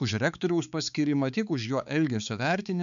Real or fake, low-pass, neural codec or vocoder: fake; 7.2 kHz; codec, 16 kHz, 4 kbps, X-Codec, HuBERT features, trained on balanced general audio